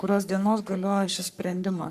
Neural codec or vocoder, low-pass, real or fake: codec, 44.1 kHz, 3.4 kbps, Pupu-Codec; 14.4 kHz; fake